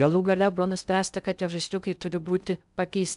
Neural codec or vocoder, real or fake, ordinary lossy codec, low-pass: codec, 16 kHz in and 24 kHz out, 0.6 kbps, FocalCodec, streaming, 2048 codes; fake; MP3, 96 kbps; 10.8 kHz